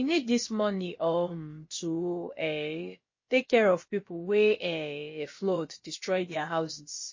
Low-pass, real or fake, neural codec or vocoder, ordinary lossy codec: 7.2 kHz; fake; codec, 16 kHz, about 1 kbps, DyCAST, with the encoder's durations; MP3, 32 kbps